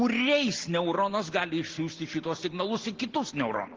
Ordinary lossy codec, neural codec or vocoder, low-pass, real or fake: Opus, 16 kbps; none; 7.2 kHz; real